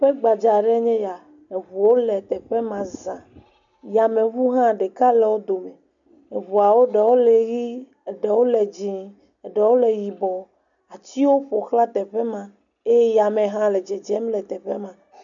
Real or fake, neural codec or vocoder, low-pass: real; none; 7.2 kHz